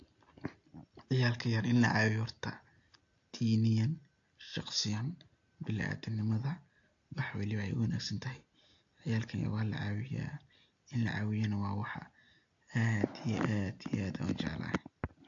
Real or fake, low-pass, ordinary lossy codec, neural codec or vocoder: real; 7.2 kHz; AAC, 48 kbps; none